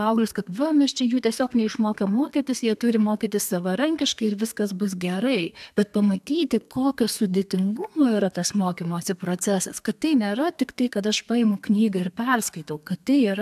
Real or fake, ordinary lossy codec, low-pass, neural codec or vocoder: fake; AAC, 96 kbps; 14.4 kHz; codec, 32 kHz, 1.9 kbps, SNAC